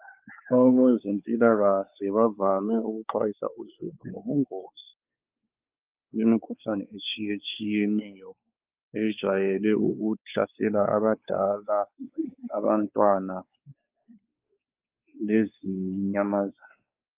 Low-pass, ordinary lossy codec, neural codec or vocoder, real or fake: 3.6 kHz; Opus, 32 kbps; codec, 16 kHz, 4 kbps, X-Codec, WavLM features, trained on Multilingual LibriSpeech; fake